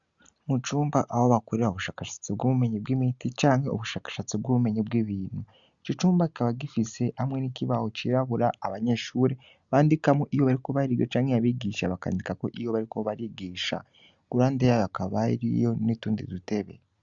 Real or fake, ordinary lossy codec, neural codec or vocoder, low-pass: real; Opus, 64 kbps; none; 7.2 kHz